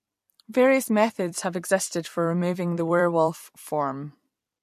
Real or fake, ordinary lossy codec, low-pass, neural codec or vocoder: fake; MP3, 64 kbps; 14.4 kHz; vocoder, 44.1 kHz, 128 mel bands every 256 samples, BigVGAN v2